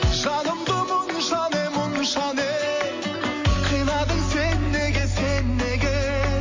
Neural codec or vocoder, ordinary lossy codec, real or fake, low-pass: none; MP3, 32 kbps; real; 7.2 kHz